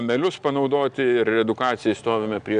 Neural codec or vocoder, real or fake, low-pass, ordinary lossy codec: none; real; 9.9 kHz; Opus, 64 kbps